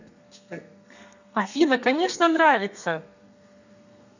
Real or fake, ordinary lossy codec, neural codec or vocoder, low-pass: fake; none; codec, 32 kHz, 1.9 kbps, SNAC; 7.2 kHz